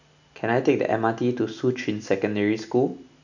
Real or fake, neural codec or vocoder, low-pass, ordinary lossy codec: real; none; 7.2 kHz; none